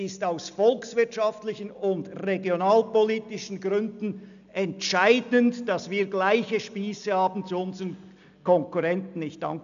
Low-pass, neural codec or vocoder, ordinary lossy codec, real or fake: 7.2 kHz; none; MP3, 96 kbps; real